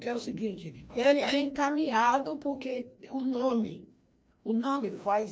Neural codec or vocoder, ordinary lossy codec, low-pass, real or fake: codec, 16 kHz, 1 kbps, FreqCodec, larger model; none; none; fake